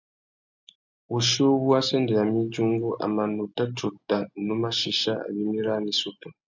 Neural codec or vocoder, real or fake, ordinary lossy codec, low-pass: none; real; MP3, 64 kbps; 7.2 kHz